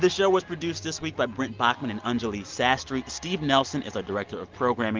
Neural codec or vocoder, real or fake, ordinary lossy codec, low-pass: none; real; Opus, 32 kbps; 7.2 kHz